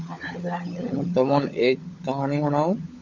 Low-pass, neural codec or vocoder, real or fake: 7.2 kHz; codec, 16 kHz, 16 kbps, FunCodec, trained on LibriTTS, 50 frames a second; fake